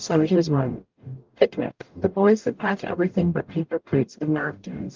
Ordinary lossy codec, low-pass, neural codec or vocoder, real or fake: Opus, 32 kbps; 7.2 kHz; codec, 44.1 kHz, 0.9 kbps, DAC; fake